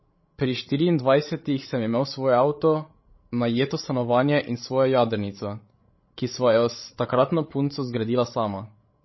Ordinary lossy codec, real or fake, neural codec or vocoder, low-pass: MP3, 24 kbps; fake; codec, 16 kHz, 16 kbps, FreqCodec, larger model; 7.2 kHz